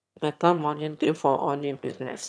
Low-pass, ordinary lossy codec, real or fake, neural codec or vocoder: none; none; fake; autoencoder, 22.05 kHz, a latent of 192 numbers a frame, VITS, trained on one speaker